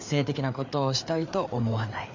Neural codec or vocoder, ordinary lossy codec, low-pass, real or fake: codec, 16 kHz, 4 kbps, FreqCodec, larger model; MP3, 64 kbps; 7.2 kHz; fake